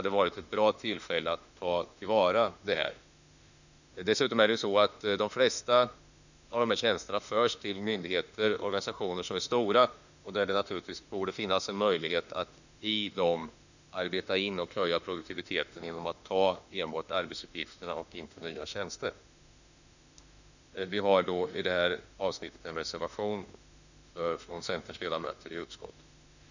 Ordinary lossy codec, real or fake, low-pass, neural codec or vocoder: none; fake; 7.2 kHz; autoencoder, 48 kHz, 32 numbers a frame, DAC-VAE, trained on Japanese speech